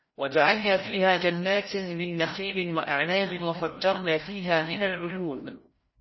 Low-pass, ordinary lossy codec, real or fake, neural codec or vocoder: 7.2 kHz; MP3, 24 kbps; fake; codec, 16 kHz, 0.5 kbps, FreqCodec, larger model